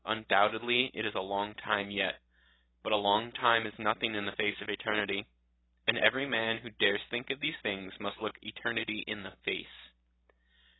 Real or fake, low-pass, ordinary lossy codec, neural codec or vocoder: real; 7.2 kHz; AAC, 16 kbps; none